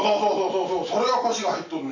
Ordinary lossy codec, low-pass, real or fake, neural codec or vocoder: none; 7.2 kHz; real; none